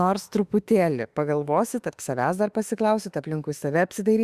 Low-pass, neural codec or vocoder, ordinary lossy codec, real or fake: 14.4 kHz; autoencoder, 48 kHz, 32 numbers a frame, DAC-VAE, trained on Japanese speech; Opus, 64 kbps; fake